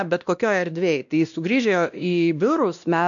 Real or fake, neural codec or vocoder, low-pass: fake; codec, 16 kHz, 1 kbps, X-Codec, WavLM features, trained on Multilingual LibriSpeech; 7.2 kHz